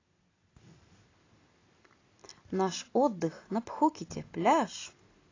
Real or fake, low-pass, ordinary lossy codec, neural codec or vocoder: real; 7.2 kHz; AAC, 32 kbps; none